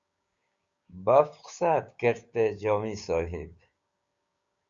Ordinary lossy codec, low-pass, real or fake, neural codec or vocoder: Opus, 64 kbps; 7.2 kHz; fake; codec, 16 kHz, 6 kbps, DAC